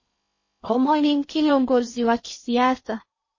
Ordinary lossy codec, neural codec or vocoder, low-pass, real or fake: MP3, 32 kbps; codec, 16 kHz in and 24 kHz out, 0.6 kbps, FocalCodec, streaming, 4096 codes; 7.2 kHz; fake